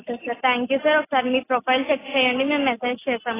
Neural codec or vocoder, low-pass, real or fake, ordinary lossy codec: none; 3.6 kHz; real; AAC, 16 kbps